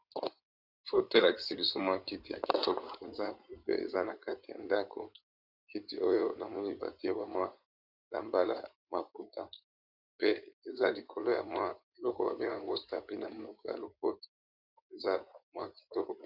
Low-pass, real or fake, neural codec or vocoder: 5.4 kHz; fake; codec, 16 kHz in and 24 kHz out, 2.2 kbps, FireRedTTS-2 codec